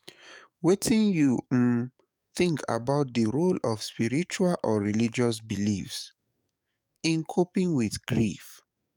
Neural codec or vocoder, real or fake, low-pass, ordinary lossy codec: codec, 44.1 kHz, 7.8 kbps, DAC; fake; 19.8 kHz; none